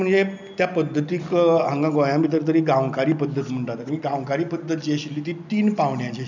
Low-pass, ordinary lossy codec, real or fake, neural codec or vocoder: 7.2 kHz; none; real; none